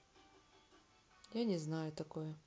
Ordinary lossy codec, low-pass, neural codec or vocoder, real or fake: none; none; none; real